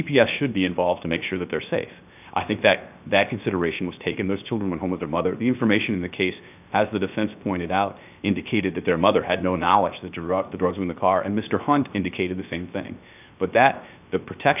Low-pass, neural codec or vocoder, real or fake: 3.6 kHz; codec, 16 kHz, 0.7 kbps, FocalCodec; fake